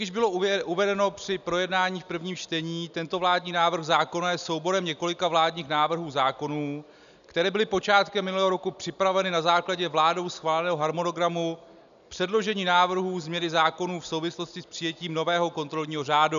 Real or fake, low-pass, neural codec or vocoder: real; 7.2 kHz; none